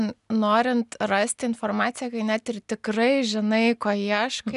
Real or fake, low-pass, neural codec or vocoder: real; 10.8 kHz; none